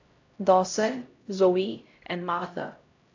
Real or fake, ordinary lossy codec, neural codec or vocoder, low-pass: fake; AAC, 48 kbps; codec, 16 kHz, 0.5 kbps, X-Codec, HuBERT features, trained on LibriSpeech; 7.2 kHz